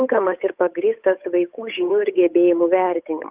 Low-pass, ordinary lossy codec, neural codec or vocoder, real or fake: 3.6 kHz; Opus, 24 kbps; codec, 16 kHz, 8 kbps, FunCodec, trained on Chinese and English, 25 frames a second; fake